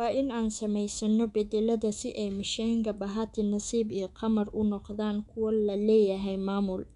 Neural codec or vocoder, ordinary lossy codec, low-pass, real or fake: codec, 24 kHz, 3.1 kbps, DualCodec; none; 10.8 kHz; fake